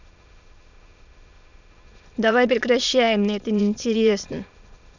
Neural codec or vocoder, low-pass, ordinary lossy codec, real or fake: autoencoder, 22.05 kHz, a latent of 192 numbers a frame, VITS, trained on many speakers; 7.2 kHz; none; fake